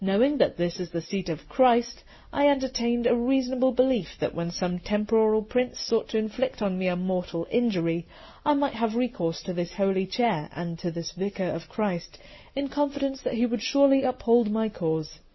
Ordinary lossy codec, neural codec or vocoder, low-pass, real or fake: MP3, 24 kbps; none; 7.2 kHz; real